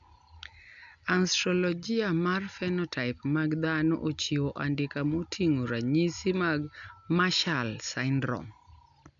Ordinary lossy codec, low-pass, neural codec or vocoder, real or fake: none; 7.2 kHz; none; real